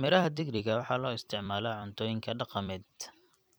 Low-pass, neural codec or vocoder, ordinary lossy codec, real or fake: none; vocoder, 44.1 kHz, 128 mel bands every 256 samples, BigVGAN v2; none; fake